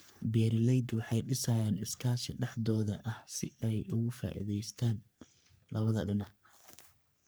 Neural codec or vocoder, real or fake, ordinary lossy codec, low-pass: codec, 44.1 kHz, 3.4 kbps, Pupu-Codec; fake; none; none